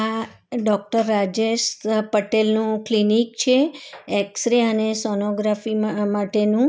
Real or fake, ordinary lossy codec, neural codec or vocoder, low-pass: real; none; none; none